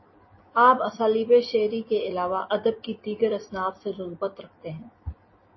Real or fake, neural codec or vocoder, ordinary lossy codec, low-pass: real; none; MP3, 24 kbps; 7.2 kHz